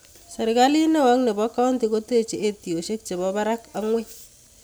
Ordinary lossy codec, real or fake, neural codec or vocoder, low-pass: none; real; none; none